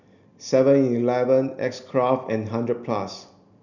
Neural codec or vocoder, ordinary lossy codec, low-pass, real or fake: none; none; 7.2 kHz; real